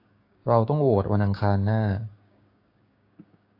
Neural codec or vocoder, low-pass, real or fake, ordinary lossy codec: codec, 16 kHz, 6 kbps, DAC; 5.4 kHz; fake; AAC, 32 kbps